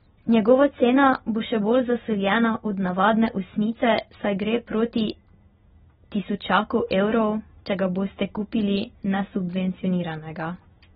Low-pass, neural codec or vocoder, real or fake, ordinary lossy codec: 19.8 kHz; none; real; AAC, 16 kbps